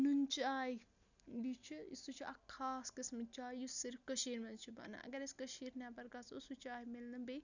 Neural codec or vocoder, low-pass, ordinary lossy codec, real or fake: none; 7.2 kHz; none; real